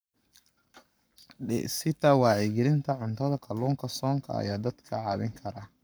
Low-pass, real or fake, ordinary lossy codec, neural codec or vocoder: none; fake; none; codec, 44.1 kHz, 7.8 kbps, Pupu-Codec